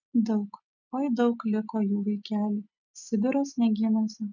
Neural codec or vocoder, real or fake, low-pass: none; real; 7.2 kHz